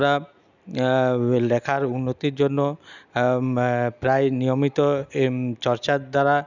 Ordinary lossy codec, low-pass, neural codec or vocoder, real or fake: none; 7.2 kHz; none; real